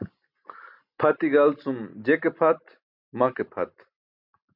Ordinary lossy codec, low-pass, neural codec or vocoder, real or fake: MP3, 48 kbps; 5.4 kHz; none; real